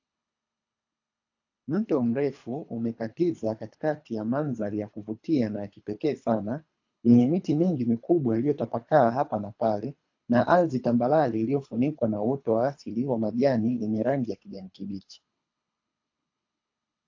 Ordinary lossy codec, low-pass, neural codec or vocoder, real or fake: AAC, 48 kbps; 7.2 kHz; codec, 24 kHz, 3 kbps, HILCodec; fake